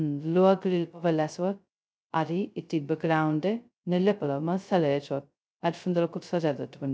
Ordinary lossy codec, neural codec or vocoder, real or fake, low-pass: none; codec, 16 kHz, 0.2 kbps, FocalCodec; fake; none